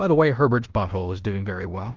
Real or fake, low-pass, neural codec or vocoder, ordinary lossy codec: fake; 7.2 kHz; codec, 16 kHz in and 24 kHz out, 0.9 kbps, LongCat-Audio-Codec, fine tuned four codebook decoder; Opus, 24 kbps